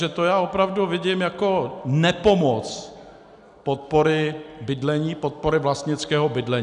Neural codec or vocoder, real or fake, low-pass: none; real; 10.8 kHz